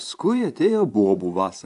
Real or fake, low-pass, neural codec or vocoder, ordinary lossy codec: fake; 10.8 kHz; vocoder, 24 kHz, 100 mel bands, Vocos; AAC, 64 kbps